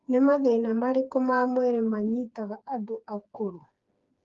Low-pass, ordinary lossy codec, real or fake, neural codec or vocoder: 7.2 kHz; Opus, 32 kbps; fake; codec, 16 kHz, 4 kbps, FreqCodec, smaller model